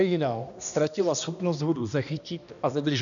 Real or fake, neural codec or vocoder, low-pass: fake; codec, 16 kHz, 1 kbps, X-Codec, HuBERT features, trained on balanced general audio; 7.2 kHz